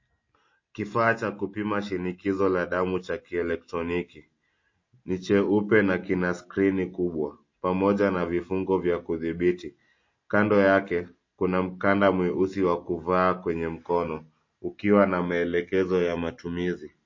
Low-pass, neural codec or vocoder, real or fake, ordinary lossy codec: 7.2 kHz; none; real; MP3, 32 kbps